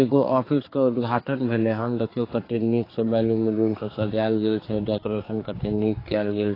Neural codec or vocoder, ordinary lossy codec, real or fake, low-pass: codec, 16 kHz, 4 kbps, X-Codec, HuBERT features, trained on general audio; AAC, 24 kbps; fake; 5.4 kHz